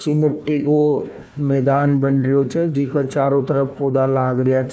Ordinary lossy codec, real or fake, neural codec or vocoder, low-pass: none; fake; codec, 16 kHz, 1 kbps, FunCodec, trained on Chinese and English, 50 frames a second; none